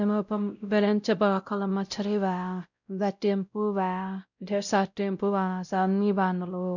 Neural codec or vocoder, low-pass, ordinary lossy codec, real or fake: codec, 16 kHz, 0.5 kbps, X-Codec, WavLM features, trained on Multilingual LibriSpeech; 7.2 kHz; none; fake